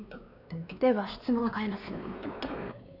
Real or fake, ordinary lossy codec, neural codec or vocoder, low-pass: fake; none; codec, 16 kHz, 2 kbps, X-Codec, WavLM features, trained on Multilingual LibriSpeech; 5.4 kHz